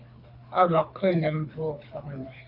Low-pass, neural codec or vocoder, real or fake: 5.4 kHz; codec, 24 kHz, 3 kbps, HILCodec; fake